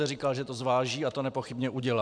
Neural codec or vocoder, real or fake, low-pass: none; real; 9.9 kHz